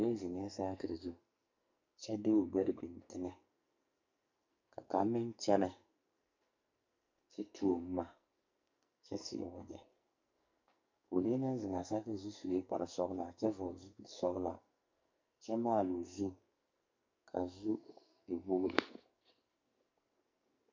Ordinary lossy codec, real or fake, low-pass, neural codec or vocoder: MP3, 64 kbps; fake; 7.2 kHz; codec, 44.1 kHz, 2.6 kbps, SNAC